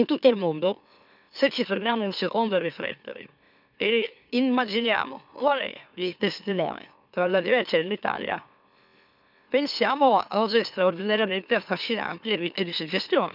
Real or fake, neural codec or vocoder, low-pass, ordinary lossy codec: fake; autoencoder, 44.1 kHz, a latent of 192 numbers a frame, MeloTTS; 5.4 kHz; none